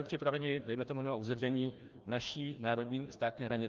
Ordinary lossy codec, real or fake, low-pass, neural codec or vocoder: Opus, 32 kbps; fake; 7.2 kHz; codec, 16 kHz, 1 kbps, FreqCodec, larger model